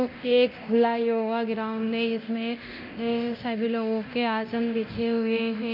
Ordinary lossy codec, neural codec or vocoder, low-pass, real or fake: none; codec, 24 kHz, 0.9 kbps, DualCodec; 5.4 kHz; fake